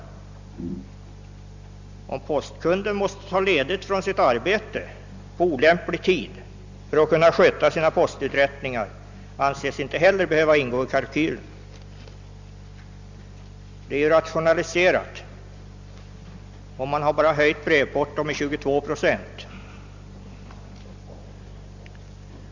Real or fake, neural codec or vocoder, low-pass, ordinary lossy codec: real; none; 7.2 kHz; Opus, 64 kbps